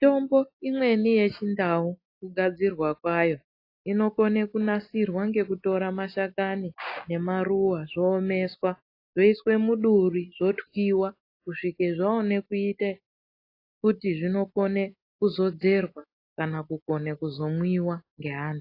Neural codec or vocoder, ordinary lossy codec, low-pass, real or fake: none; AAC, 32 kbps; 5.4 kHz; real